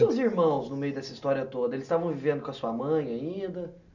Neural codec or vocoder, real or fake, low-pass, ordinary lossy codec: none; real; 7.2 kHz; none